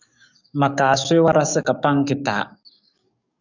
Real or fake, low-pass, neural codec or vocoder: fake; 7.2 kHz; codec, 44.1 kHz, 7.8 kbps, DAC